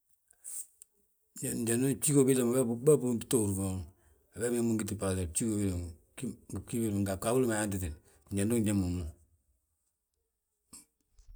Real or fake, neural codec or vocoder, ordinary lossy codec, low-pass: real; none; none; none